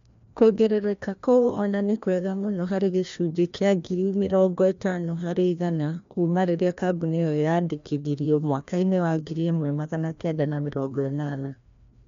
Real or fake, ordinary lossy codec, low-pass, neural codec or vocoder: fake; MP3, 64 kbps; 7.2 kHz; codec, 16 kHz, 1 kbps, FreqCodec, larger model